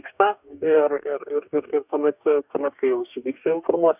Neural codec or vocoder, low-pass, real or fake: codec, 44.1 kHz, 2.6 kbps, DAC; 3.6 kHz; fake